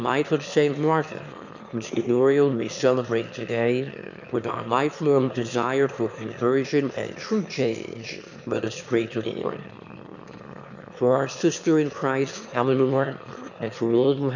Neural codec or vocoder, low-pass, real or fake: autoencoder, 22.05 kHz, a latent of 192 numbers a frame, VITS, trained on one speaker; 7.2 kHz; fake